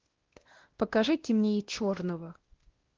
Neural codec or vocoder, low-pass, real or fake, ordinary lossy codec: codec, 16 kHz, 1 kbps, X-Codec, WavLM features, trained on Multilingual LibriSpeech; 7.2 kHz; fake; Opus, 16 kbps